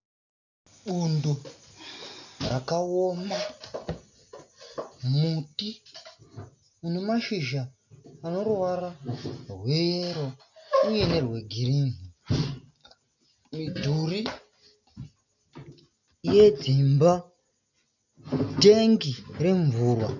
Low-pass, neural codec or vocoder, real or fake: 7.2 kHz; none; real